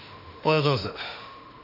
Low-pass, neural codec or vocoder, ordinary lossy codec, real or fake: 5.4 kHz; autoencoder, 48 kHz, 32 numbers a frame, DAC-VAE, trained on Japanese speech; none; fake